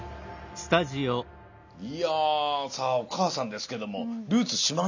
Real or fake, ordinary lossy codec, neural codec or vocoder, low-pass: real; none; none; 7.2 kHz